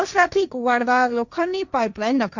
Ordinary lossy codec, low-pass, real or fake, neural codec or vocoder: none; 7.2 kHz; fake; codec, 16 kHz, 1.1 kbps, Voila-Tokenizer